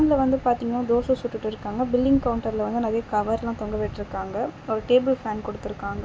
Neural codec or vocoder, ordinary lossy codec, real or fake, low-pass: none; none; real; none